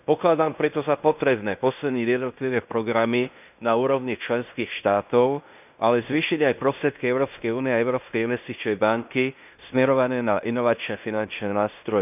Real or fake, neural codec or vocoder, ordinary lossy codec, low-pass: fake; codec, 16 kHz in and 24 kHz out, 0.9 kbps, LongCat-Audio-Codec, fine tuned four codebook decoder; none; 3.6 kHz